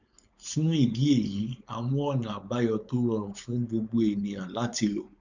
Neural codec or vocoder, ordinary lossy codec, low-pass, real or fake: codec, 16 kHz, 4.8 kbps, FACodec; none; 7.2 kHz; fake